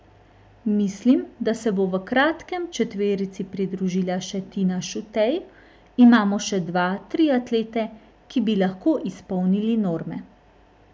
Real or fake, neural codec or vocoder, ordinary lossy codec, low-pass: real; none; none; none